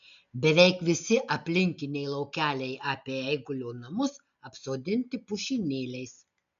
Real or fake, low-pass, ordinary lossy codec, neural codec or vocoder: real; 7.2 kHz; MP3, 96 kbps; none